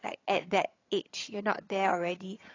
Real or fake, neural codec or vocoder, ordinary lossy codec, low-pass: fake; vocoder, 22.05 kHz, 80 mel bands, HiFi-GAN; AAC, 32 kbps; 7.2 kHz